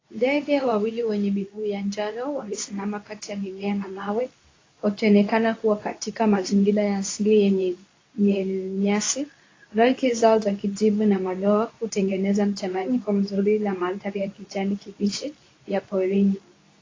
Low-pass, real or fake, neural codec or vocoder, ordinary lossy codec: 7.2 kHz; fake; codec, 24 kHz, 0.9 kbps, WavTokenizer, medium speech release version 2; AAC, 32 kbps